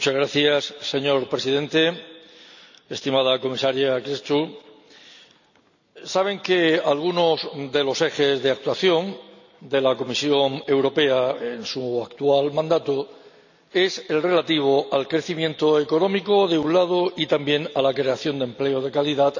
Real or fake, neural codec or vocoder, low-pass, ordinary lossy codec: real; none; 7.2 kHz; none